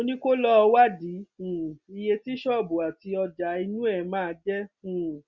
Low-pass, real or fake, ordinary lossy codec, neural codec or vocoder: 7.2 kHz; real; Opus, 64 kbps; none